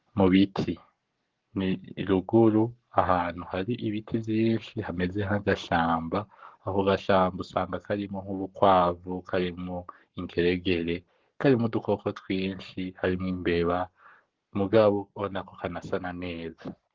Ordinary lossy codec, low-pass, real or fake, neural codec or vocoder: Opus, 16 kbps; 7.2 kHz; fake; codec, 44.1 kHz, 7.8 kbps, Pupu-Codec